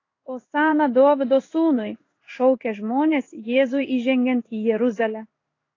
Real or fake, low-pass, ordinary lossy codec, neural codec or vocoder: fake; 7.2 kHz; AAC, 32 kbps; codec, 16 kHz in and 24 kHz out, 1 kbps, XY-Tokenizer